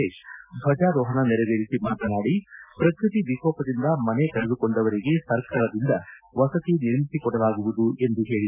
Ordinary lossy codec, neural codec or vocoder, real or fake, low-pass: none; none; real; 3.6 kHz